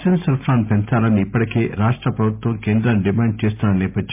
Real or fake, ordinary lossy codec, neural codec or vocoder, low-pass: real; MP3, 32 kbps; none; 3.6 kHz